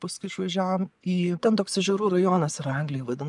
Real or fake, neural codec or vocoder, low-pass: fake; vocoder, 24 kHz, 100 mel bands, Vocos; 10.8 kHz